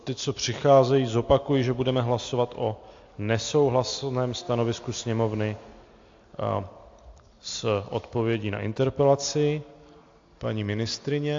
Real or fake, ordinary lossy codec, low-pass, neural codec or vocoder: real; AAC, 48 kbps; 7.2 kHz; none